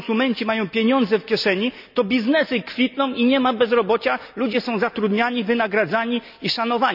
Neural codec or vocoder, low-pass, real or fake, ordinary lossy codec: none; 5.4 kHz; real; none